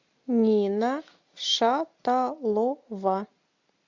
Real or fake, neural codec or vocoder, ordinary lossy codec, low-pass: real; none; MP3, 64 kbps; 7.2 kHz